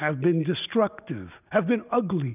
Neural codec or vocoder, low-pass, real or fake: none; 3.6 kHz; real